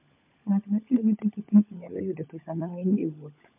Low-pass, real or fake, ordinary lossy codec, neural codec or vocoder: 3.6 kHz; fake; MP3, 32 kbps; codec, 16 kHz, 16 kbps, FunCodec, trained on LibriTTS, 50 frames a second